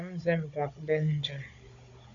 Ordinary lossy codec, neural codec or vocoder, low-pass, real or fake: AAC, 48 kbps; codec, 16 kHz, 16 kbps, FunCodec, trained on Chinese and English, 50 frames a second; 7.2 kHz; fake